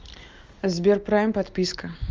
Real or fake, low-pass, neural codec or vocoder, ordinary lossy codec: real; 7.2 kHz; none; Opus, 32 kbps